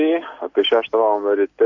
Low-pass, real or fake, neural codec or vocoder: 7.2 kHz; real; none